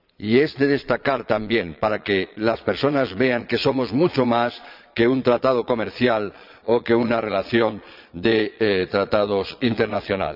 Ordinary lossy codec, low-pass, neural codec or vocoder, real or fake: none; 5.4 kHz; vocoder, 22.05 kHz, 80 mel bands, Vocos; fake